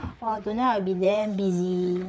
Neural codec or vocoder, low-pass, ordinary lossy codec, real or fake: codec, 16 kHz, 4 kbps, FreqCodec, larger model; none; none; fake